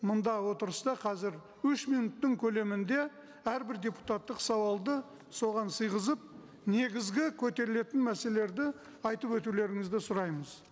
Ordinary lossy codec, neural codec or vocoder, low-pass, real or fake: none; none; none; real